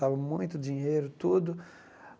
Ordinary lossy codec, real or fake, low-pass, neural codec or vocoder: none; real; none; none